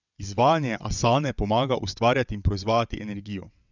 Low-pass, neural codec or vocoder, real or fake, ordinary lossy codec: 7.2 kHz; vocoder, 22.05 kHz, 80 mel bands, WaveNeXt; fake; none